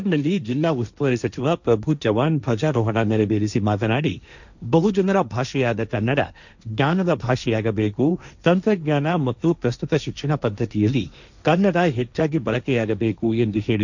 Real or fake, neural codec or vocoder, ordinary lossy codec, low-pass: fake; codec, 16 kHz, 1.1 kbps, Voila-Tokenizer; none; 7.2 kHz